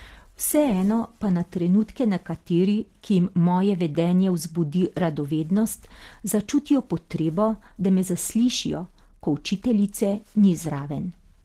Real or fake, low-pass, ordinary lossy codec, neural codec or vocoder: real; 14.4 kHz; Opus, 16 kbps; none